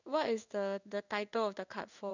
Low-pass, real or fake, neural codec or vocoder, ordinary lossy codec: 7.2 kHz; fake; codec, 16 kHz in and 24 kHz out, 1 kbps, XY-Tokenizer; none